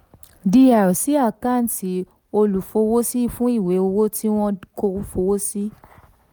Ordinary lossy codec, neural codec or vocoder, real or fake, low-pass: none; none; real; none